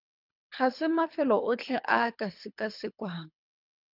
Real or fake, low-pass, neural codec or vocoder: fake; 5.4 kHz; codec, 24 kHz, 3 kbps, HILCodec